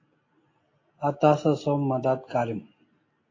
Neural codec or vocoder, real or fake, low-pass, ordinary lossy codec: none; real; 7.2 kHz; AAC, 32 kbps